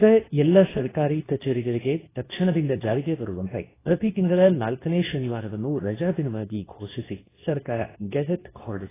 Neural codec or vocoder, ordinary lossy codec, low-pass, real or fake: codec, 24 kHz, 0.9 kbps, WavTokenizer, medium speech release version 2; AAC, 16 kbps; 3.6 kHz; fake